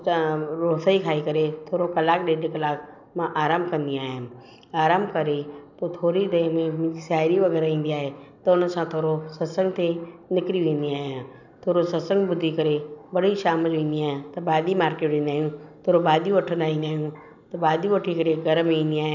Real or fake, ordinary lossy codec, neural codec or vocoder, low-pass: real; none; none; 7.2 kHz